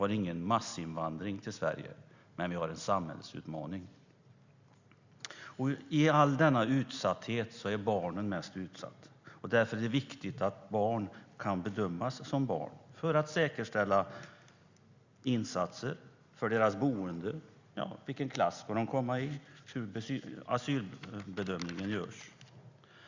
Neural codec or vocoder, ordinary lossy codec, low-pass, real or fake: none; Opus, 64 kbps; 7.2 kHz; real